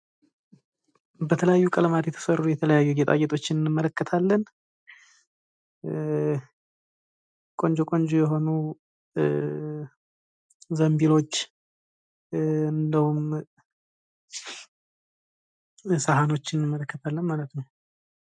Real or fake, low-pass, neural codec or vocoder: real; 9.9 kHz; none